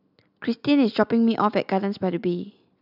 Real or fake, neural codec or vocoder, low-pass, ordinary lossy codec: real; none; 5.4 kHz; none